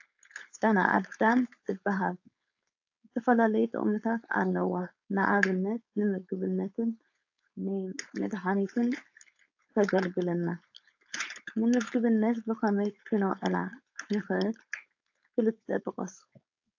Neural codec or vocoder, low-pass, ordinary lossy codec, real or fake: codec, 16 kHz, 4.8 kbps, FACodec; 7.2 kHz; AAC, 48 kbps; fake